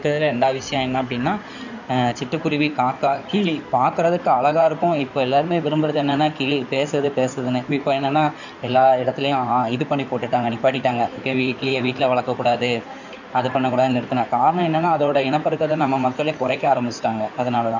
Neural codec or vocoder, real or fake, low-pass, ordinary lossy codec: codec, 16 kHz in and 24 kHz out, 2.2 kbps, FireRedTTS-2 codec; fake; 7.2 kHz; none